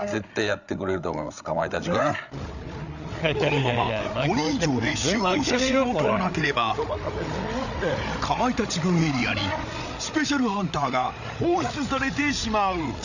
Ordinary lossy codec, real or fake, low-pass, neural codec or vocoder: none; fake; 7.2 kHz; codec, 16 kHz, 8 kbps, FreqCodec, larger model